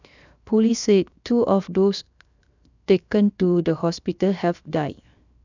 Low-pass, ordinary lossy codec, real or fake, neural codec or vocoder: 7.2 kHz; none; fake; codec, 16 kHz, 0.7 kbps, FocalCodec